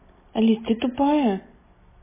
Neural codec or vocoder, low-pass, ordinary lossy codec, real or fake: none; 3.6 kHz; MP3, 16 kbps; real